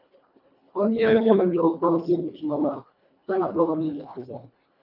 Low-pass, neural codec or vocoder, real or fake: 5.4 kHz; codec, 24 kHz, 1.5 kbps, HILCodec; fake